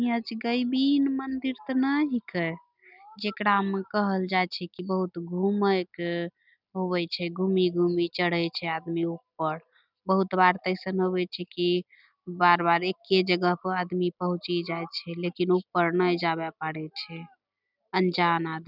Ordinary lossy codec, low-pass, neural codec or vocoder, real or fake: none; 5.4 kHz; none; real